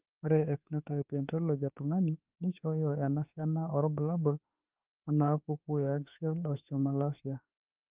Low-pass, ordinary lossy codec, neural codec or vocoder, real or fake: 3.6 kHz; Opus, 32 kbps; autoencoder, 48 kHz, 32 numbers a frame, DAC-VAE, trained on Japanese speech; fake